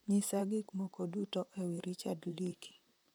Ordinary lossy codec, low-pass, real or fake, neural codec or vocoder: none; none; fake; vocoder, 44.1 kHz, 128 mel bands, Pupu-Vocoder